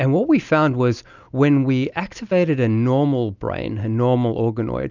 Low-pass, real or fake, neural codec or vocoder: 7.2 kHz; real; none